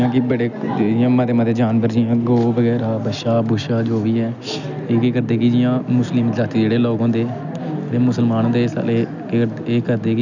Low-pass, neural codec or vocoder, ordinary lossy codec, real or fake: 7.2 kHz; none; none; real